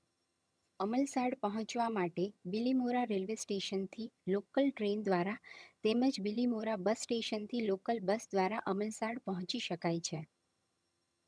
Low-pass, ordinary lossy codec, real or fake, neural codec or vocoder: none; none; fake; vocoder, 22.05 kHz, 80 mel bands, HiFi-GAN